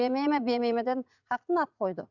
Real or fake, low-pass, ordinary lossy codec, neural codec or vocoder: fake; 7.2 kHz; none; vocoder, 44.1 kHz, 80 mel bands, Vocos